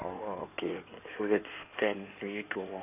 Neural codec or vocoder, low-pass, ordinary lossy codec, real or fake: codec, 16 kHz in and 24 kHz out, 2.2 kbps, FireRedTTS-2 codec; 3.6 kHz; none; fake